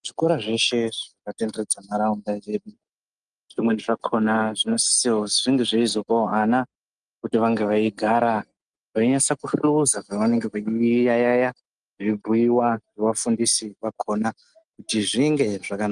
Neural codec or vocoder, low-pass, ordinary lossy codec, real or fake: none; 9.9 kHz; Opus, 32 kbps; real